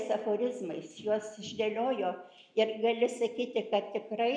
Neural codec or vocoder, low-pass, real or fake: none; 9.9 kHz; real